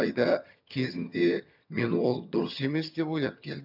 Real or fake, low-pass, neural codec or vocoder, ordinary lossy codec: fake; 5.4 kHz; vocoder, 22.05 kHz, 80 mel bands, HiFi-GAN; none